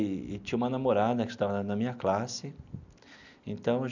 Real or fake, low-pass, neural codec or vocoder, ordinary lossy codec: real; 7.2 kHz; none; none